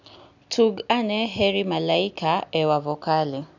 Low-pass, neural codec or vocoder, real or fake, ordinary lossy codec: 7.2 kHz; none; real; none